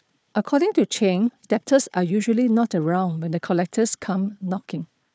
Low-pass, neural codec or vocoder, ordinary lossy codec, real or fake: none; codec, 16 kHz, 4 kbps, FunCodec, trained on Chinese and English, 50 frames a second; none; fake